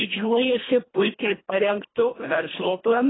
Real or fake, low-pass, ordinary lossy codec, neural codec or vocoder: fake; 7.2 kHz; AAC, 16 kbps; codec, 24 kHz, 1.5 kbps, HILCodec